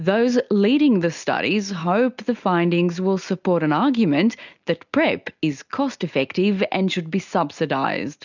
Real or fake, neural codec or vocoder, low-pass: real; none; 7.2 kHz